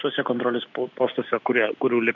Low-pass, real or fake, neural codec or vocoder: 7.2 kHz; real; none